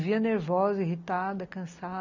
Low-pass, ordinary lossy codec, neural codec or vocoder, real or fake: 7.2 kHz; none; none; real